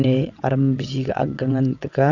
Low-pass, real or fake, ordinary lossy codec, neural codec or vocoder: 7.2 kHz; fake; none; vocoder, 22.05 kHz, 80 mel bands, WaveNeXt